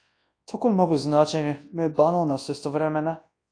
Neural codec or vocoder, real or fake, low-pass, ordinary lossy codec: codec, 24 kHz, 0.9 kbps, WavTokenizer, large speech release; fake; 9.9 kHz; AAC, 48 kbps